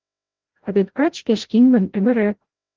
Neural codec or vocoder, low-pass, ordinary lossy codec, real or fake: codec, 16 kHz, 0.5 kbps, FreqCodec, larger model; 7.2 kHz; Opus, 16 kbps; fake